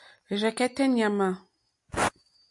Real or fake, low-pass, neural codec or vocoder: real; 10.8 kHz; none